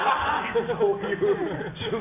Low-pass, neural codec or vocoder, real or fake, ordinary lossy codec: 3.6 kHz; none; real; none